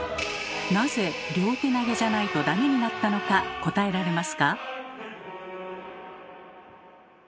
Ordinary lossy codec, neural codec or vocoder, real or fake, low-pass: none; none; real; none